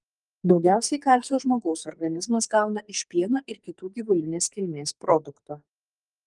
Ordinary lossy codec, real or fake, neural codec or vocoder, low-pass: Opus, 32 kbps; fake; codec, 44.1 kHz, 2.6 kbps, SNAC; 10.8 kHz